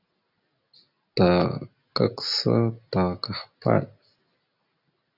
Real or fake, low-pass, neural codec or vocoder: real; 5.4 kHz; none